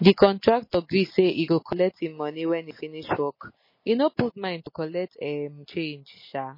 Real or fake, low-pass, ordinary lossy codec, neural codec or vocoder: real; 5.4 kHz; MP3, 24 kbps; none